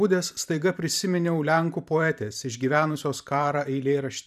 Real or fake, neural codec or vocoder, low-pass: fake; vocoder, 44.1 kHz, 128 mel bands every 512 samples, BigVGAN v2; 14.4 kHz